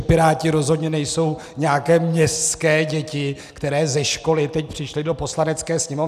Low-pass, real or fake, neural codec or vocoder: 14.4 kHz; real; none